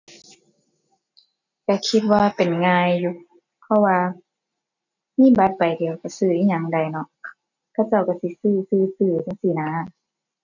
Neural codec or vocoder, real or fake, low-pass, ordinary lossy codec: none; real; 7.2 kHz; none